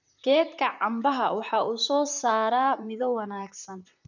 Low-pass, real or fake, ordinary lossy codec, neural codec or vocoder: 7.2 kHz; fake; none; vocoder, 44.1 kHz, 128 mel bands, Pupu-Vocoder